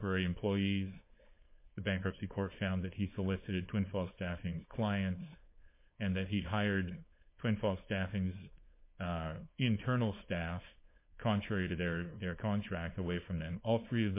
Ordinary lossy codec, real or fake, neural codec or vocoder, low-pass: MP3, 24 kbps; fake; codec, 16 kHz, 4.8 kbps, FACodec; 3.6 kHz